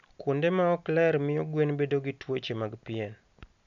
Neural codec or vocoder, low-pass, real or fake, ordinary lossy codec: none; 7.2 kHz; real; none